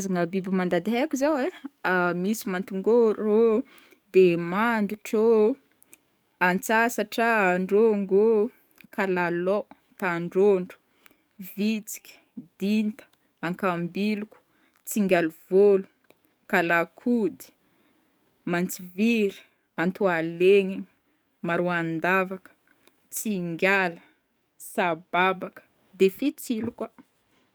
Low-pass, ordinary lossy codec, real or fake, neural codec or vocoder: none; none; fake; codec, 44.1 kHz, 7.8 kbps, DAC